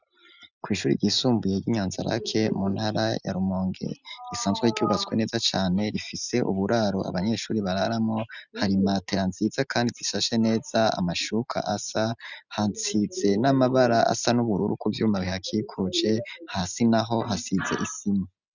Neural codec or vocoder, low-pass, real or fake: none; 7.2 kHz; real